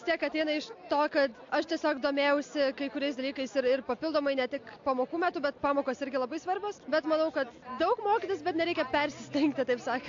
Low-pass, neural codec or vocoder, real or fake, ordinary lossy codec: 7.2 kHz; none; real; AAC, 48 kbps